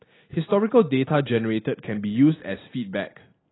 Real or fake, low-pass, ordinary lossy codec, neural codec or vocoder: real; 7.2 kHz; AAC, 16 kbps; none